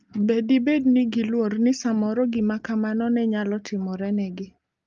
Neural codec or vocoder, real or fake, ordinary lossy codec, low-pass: none; real; Opus, 32 kbps; 7.2 kHz